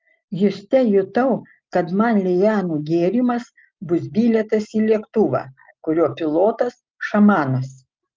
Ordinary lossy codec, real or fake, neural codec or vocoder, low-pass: Opus, 32 kbps; real; none; 7.2 kHz